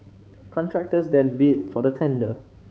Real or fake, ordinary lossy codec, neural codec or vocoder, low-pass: fake; none; codec, 16 kHz, 4 kbps, X-Codec, HuBERT features, trained on balanced general audio; none